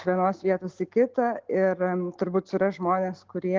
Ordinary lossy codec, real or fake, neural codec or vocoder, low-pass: Opus, 16 kbps; real; none; 7.2 kHz